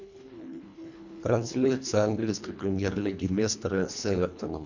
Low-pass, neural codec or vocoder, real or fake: 7.2 kHz; codec, 24 kHz, 1.5 kbps, HILCodec; fake